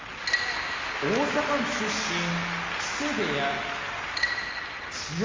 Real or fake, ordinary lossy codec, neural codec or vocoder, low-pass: real; Opus, 32 kbps; none; 7.2 kHz